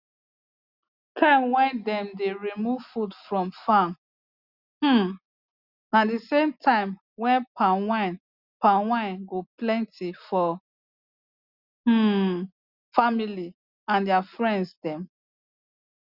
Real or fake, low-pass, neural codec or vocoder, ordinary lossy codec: real; 5.4 kHz; none; Opus, 64 kbps